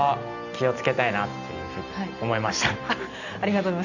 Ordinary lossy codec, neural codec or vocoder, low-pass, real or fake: none; none; 7.2 kHz; real